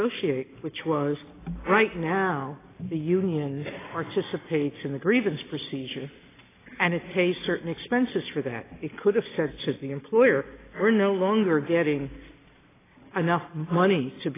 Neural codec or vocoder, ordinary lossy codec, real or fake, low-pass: none; AAC, 16 kbps; real; 3.6 kHz